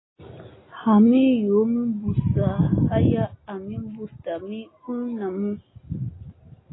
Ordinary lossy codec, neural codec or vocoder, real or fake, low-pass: AAC, 16 kbps; none; real; 7.2 kHz